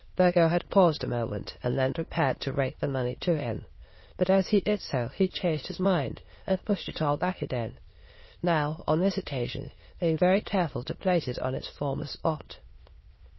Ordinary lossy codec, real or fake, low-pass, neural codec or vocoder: MP3, 24 kbps; fake; 7.2 kHz; autoencoder, 22.05 kHz, a latent of 192 numbers a frame, VITS, trained on many speakers